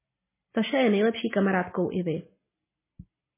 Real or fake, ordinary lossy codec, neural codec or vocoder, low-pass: real; MP3, 16 kbps; none; 3.6 kHz